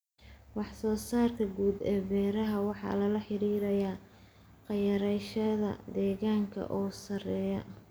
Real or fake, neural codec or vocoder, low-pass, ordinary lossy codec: real; none; none; none